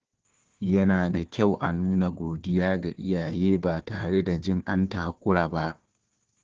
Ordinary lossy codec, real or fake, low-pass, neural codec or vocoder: Opus, 16 kbps; fake; 7.2 kHz; codec, 16 kHz, 1 kbps, FunCodec, trained on Chinese and English, 50 frames a second